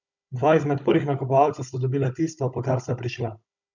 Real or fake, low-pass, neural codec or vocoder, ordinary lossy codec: fake; 7.2 kHz; codec, 16 kHz, 16 kbps, FunCodec, trained on Chinese and English, 50 frames a second; none